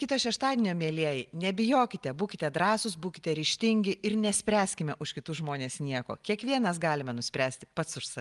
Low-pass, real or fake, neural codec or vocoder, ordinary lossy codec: 10.8 kHz; real; none; Opus, 32 kbps